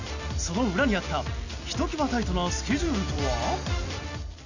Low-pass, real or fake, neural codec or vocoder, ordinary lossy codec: 7.2 kHz; real; none; none